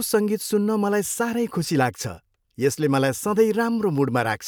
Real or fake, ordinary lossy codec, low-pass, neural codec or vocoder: real; none; none; none